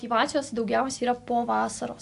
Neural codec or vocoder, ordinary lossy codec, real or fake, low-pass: none; AAC, 64 kbps; real; 10.8 kHz